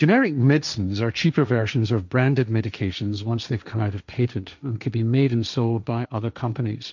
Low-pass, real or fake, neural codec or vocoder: 7.2 kHz; fake; codec, 16 kHz, 1.1 kbps, Voila-Tokenizer